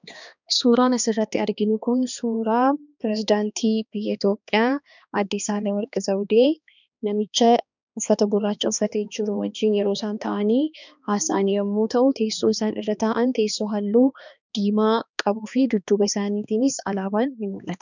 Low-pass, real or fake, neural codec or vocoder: 7.2 kHz; fake; codec, 16 kHz, 2 kbps, X-Codec, HuBERT features, trained on balanced general audio